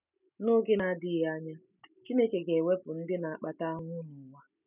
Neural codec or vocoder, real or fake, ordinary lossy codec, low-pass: none; real; none; 3.6 kHz